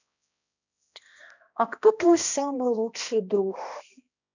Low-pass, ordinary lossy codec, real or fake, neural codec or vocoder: 7.2 kHz; none; fake; codec, 16 kHz, 1 kbps, X-Codec, HuBERT features, trained on balanced general audio